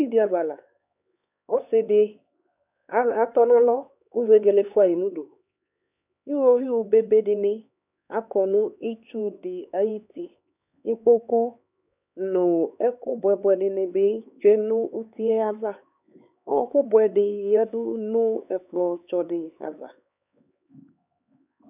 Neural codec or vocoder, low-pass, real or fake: codec, 16 kHz, 4 kbps, X-Codec, HuBERT features, trained on LibriSpeech; 3.6 kHz; fake